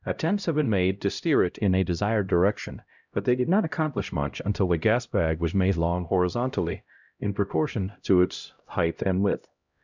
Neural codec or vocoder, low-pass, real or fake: codec, 16 kHz, 0.5 kbps, X-Codec, HuBERT features, trained on LibriSpeech; 7.2 kHz; fake